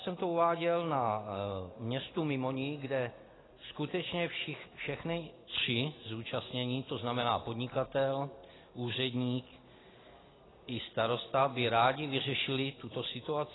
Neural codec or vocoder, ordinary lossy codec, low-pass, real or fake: none; AAC, 16 kbps; 7.2 kHz; real